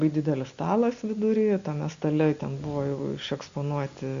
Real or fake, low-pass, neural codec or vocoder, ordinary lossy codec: real; 7.2 kHz; none; Opus, 64 kbps